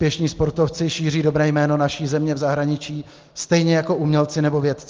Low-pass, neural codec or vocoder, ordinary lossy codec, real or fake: 7.2 kHz; none; Opus, 32 kbps; real